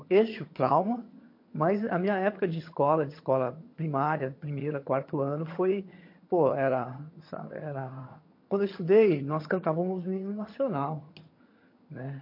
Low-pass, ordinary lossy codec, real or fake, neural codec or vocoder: 5.4 kHz; MP3, 32 kbps; fake; vocoder, 22.05 kHz, 80 mel bands, HiFi-GAN